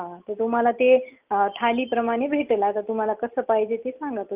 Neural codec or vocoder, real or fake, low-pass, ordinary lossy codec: none; real; 3.6 kHz; Opus, 32 kbps